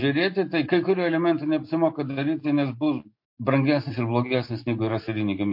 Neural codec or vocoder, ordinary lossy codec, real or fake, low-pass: none; MP3, 32 kbps; real; 5.4 kHz